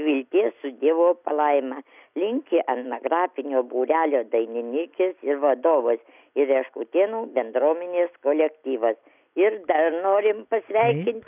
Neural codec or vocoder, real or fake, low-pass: none; real; 3.6 kHz